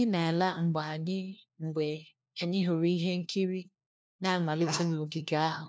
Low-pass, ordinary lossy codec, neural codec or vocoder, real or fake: none; none; codec, 16 kHz, 1 kbps, FunCodec, trained on LibriTTS, 50 frames a second; fake